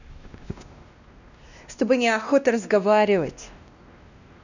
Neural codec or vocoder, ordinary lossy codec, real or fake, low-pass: codec, 16 kHz, 1 kbps, X-Codec, WavLM features, trained on Multilingual LibriSpeech; none; fake; 7.2 kHz